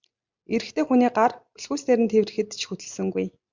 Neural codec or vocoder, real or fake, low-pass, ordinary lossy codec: none; real; 7.2 kHz; MP3, 64 kbps